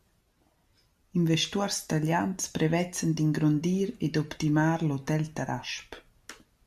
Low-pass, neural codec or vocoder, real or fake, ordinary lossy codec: 14.4 kHz; none; real; AAC, 96 kbps